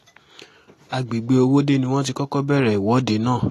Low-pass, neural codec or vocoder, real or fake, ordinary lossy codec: 14.4 kHz; none; real; AAC, 48 kbps